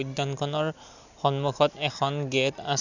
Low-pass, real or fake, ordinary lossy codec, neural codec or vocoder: 7.2 kHz; real; none; none